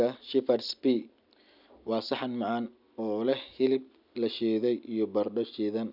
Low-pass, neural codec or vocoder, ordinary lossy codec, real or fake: 5.4 kHz; none; none; real